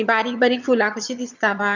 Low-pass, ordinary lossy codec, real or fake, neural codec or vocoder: 7.2 kHz; none; fake; vocoder, 22.05 kHz, 80 mel bands, HiFi-GAN